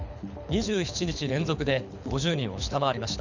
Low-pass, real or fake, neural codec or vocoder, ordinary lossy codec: 7.2 kHz; fake; codec, 24 kHz, 6 kbps, HILCodec; none